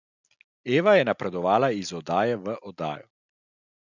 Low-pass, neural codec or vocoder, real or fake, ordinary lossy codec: 7.2 kHz; none; real; none